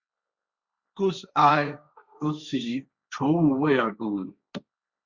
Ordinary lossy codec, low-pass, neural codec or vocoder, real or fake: Opus, 64 kbps; 7.2 kHz; codec, 16 kHz, 1.1 kbps, Voila-Tokenizer; fake